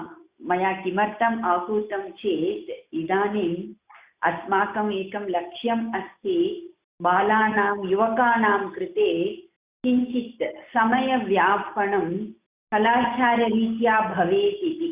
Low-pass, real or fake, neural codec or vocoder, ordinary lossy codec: 3.6 kHz; real; none; Opus, 64 kbps